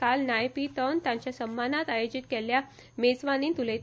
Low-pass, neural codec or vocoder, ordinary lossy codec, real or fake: none; none; none; real